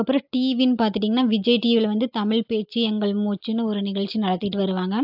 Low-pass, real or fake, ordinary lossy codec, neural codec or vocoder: 5.4 kHz; real; AAC, 48 kbps; none